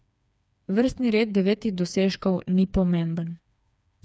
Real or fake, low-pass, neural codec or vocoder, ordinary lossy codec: fake; none; codec, 16 kHz, 4 kbps, FreqCodec, smaller model; none